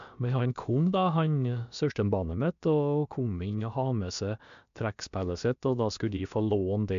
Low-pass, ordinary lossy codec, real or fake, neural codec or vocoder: 7.2 kHz; MP3, 64 kbps; fake; codec, 16 kHz, about 1 kbps, DyCAST, with the encoder's durations